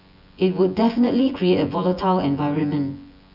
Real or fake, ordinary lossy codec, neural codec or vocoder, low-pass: fake; none; vocoder, 24 kHz, 100 mel bands, Vocos; 5.4 kHz